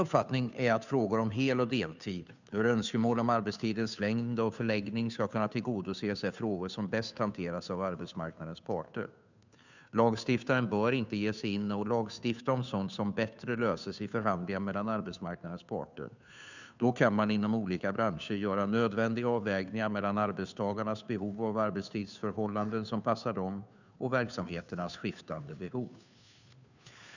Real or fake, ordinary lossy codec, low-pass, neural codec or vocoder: fake; none; 7.2 kHz; codec, 16 kHz, 2 kbps, FunCodec, trained on Chinese and English, 25 frames a second